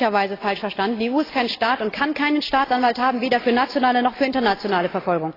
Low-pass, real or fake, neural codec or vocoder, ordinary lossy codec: 5.4 kHz; real; none; AAC, 24 kbps